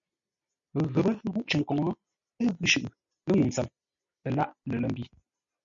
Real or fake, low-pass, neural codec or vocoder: real; 7.2 kHz; none